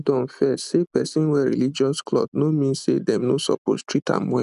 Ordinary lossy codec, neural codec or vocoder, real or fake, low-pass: none; none; real; 10.8 kHz